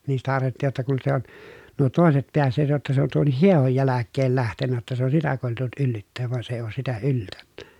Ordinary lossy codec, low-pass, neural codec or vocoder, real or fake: none; 19.8 kHz; none; real